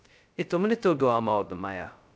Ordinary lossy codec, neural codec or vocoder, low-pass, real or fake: none; codec, 16 kHz, 0.2 kbps, FocalCodec; none; fake